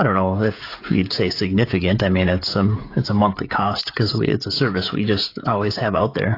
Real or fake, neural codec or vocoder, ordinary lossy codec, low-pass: fake; codec, 16 kHz, 16 kbps, FreqCodec, smaller model; AAC, 32 kbps; 5.4 kHz